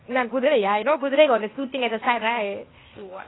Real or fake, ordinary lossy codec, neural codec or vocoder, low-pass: fake; AAC, 16 kbps; codec, 16 kHz, 0.8 kbps, ZipCodec; 7.2 kHz